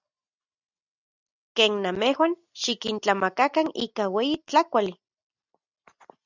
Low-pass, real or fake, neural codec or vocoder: 7.2 kHz; real; none